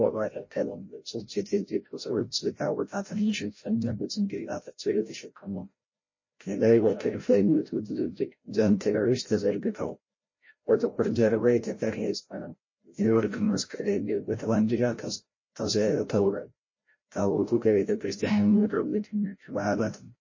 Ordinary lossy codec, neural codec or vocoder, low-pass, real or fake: MP3, 32 kbps; codec, 16 kHz, 0.5 kbps, FreqCodec, larger model; 7.2 kHz; fake